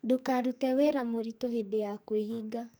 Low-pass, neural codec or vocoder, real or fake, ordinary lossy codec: none; codec, 44.1 kHz, 2.6 kbps, SNAC; fake; none